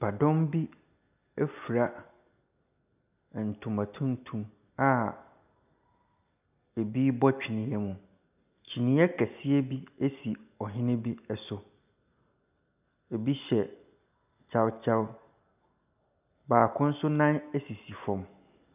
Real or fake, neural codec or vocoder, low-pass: real; none; 3.6 kHz